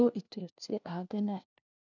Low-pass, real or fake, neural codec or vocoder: 7.2 kHz; fake; codec, 16 kHz, 1 kbps, FunCodec, trained on LibriTTS, 50 frames a second